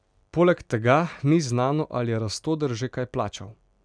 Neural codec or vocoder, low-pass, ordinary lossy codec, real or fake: none; 9.9 kHz; none; real